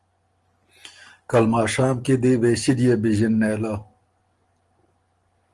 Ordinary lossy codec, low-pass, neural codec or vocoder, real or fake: Opus, 24 kbps; 10.8 kHz; none; real